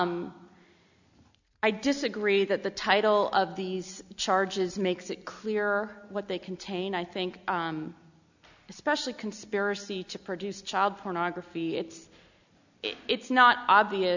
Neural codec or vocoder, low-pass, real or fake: none; 7.2 kHz; real